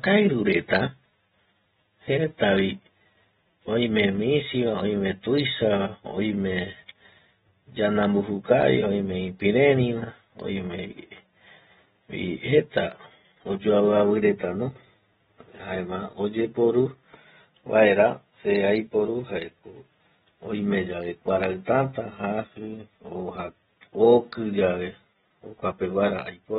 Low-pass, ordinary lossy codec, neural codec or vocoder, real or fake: 19.8 kHz; AAC, 16 kbps; none; real